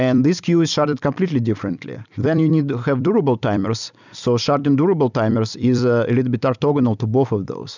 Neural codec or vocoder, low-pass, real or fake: vocoder, 44.1 kHz, 128 mel bands every 256 samples, BigVGAN v2; 7.2 kHz; fake